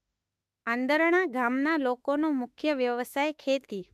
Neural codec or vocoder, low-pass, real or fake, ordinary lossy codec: autoencoder, 48 kHz, 32 numbers a frame, DAC-VAE, trained on Japanese speech; 14.4 kHz; fake; AAC, 96 kbps